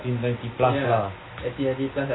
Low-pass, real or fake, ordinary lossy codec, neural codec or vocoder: 7.2 kHz; real; AAC, 16 kbps; none